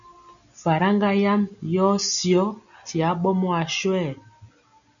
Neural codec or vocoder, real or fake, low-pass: none; real; 7.2 kHz